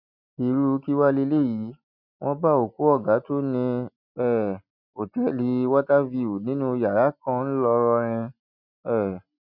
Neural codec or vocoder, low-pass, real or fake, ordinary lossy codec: none; 5.4 kHz; real; none